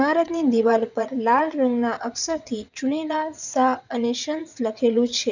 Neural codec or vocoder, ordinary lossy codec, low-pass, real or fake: vocoder, 22.05 kHz, 80 mel bands, WaveNeXt; none; 7.2 kHz; fake